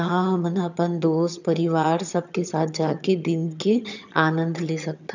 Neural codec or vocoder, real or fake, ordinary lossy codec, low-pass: vocoder, 22.05 kHz, 80 mel bands, HiFi-GAN; fake; none; 7.2 kHz